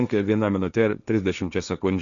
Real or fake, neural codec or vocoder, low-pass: fake; codec, 16 kHz, 1.1 kbps, Voila-Tokenizer; 7.2 kHz